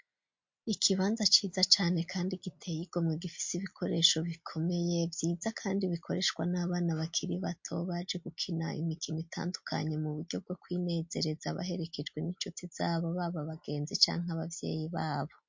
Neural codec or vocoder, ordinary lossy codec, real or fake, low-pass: none; MP3, 48 kbps; real; 7.2 kHz